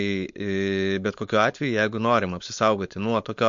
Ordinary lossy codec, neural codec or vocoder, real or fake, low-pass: MP3, 48 kbps; codec, 16 kHz, 4.8 kbps, FACodec; fake; 7.2 kHz